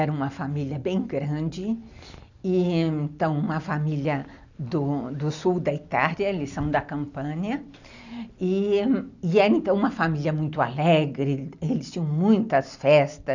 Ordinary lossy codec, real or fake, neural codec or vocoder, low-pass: none; real; none; 7.2 kHz